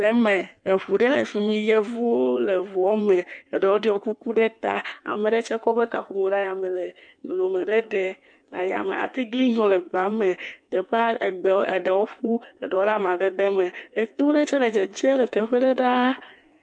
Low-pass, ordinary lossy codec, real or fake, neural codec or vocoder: 9.9 kHz; MP3, 96 kbps; fake; codec, 16 kHz in and 24 kHz out, 1.1 kbps, FireRedTTS-2 codec